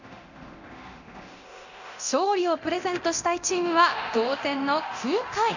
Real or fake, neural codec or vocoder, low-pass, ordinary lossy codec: fake; codec, 24 kHz, 0.9 kbps, DualCodec; 7.2 kHz; none